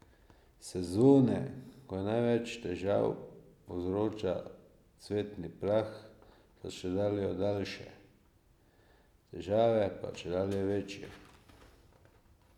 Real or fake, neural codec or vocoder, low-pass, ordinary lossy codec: real; none; 19.8 kHz; none